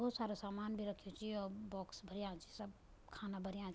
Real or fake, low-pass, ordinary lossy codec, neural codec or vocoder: real; none; none; none